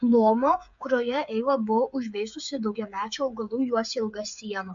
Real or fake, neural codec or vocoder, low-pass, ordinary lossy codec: fake; codec, 16 kHz, 8 kbps, FreqCodec, smaller model; 7.2 kHz; AAC, 64 kbps